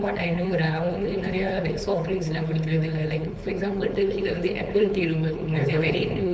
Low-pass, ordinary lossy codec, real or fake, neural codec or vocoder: none; none; fake; codec, 16 kHz, 4.8 kbps, FACodec